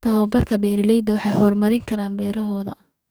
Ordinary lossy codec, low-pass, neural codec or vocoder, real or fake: none; none; codec, 44.1 kHz, 2.6 kbps, DAC; fake